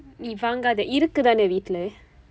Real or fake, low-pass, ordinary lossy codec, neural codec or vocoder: real; none; none; none